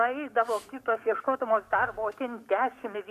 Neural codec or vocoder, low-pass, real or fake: codec, 44.1 kHz, 7.8 kbps, DAC; 14.4 kHz; fake